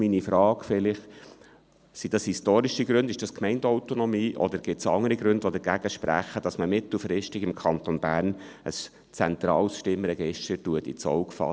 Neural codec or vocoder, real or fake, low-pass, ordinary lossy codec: none; real; none; none